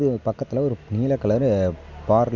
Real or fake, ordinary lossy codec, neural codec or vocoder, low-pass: real; none; none; 7.2 kHz